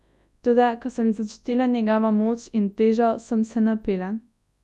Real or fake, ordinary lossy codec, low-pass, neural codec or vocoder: fake; none; none; codec, 24 kHz, 0.9 kbps, WavTokenizer, large speech release